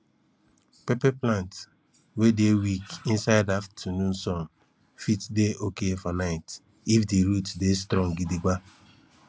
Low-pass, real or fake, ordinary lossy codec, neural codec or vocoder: none; real; none; none